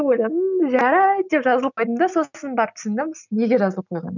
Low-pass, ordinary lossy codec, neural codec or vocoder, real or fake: 7.2 kHz; none; none; real